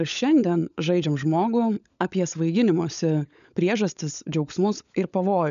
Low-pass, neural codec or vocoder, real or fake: 7.2 kHz; codec, 16 kHz, 16 kbps, FunCodec, trained on LibriTTS, 50 frames a second; fake